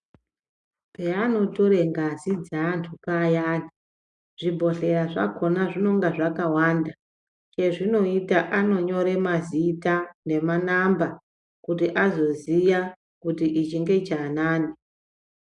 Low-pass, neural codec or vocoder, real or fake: 10.8 kHz; none; real